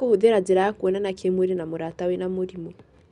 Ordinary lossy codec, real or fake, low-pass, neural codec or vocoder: Opus, 64 kbps; real; 10.8 kHz; none